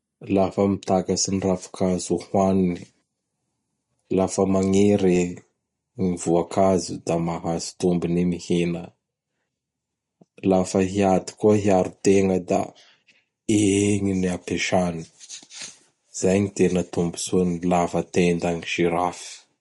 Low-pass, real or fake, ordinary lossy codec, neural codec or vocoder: 19.8 kHz; real; MP3, 48 kbps; none